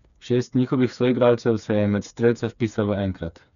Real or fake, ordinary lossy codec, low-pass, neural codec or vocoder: fake; none; 7.2 kHz; codec, 16 kHz, 4 kbps, FreqCodec, smaller model